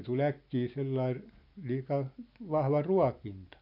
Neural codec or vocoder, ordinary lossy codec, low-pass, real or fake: none; none; 5.4 kHz; real